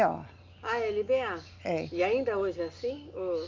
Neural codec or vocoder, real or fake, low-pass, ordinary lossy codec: none; real; 7.2 kHz; Opus, 24 kbps